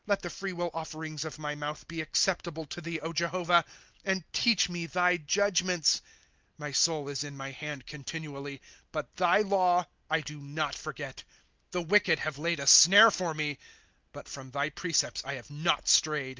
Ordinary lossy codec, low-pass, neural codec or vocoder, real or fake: Opus, 32 kbps; 7.2 kHz; none; real